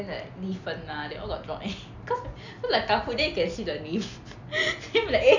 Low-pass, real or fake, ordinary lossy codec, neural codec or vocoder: 7.2 kHz; real; none; none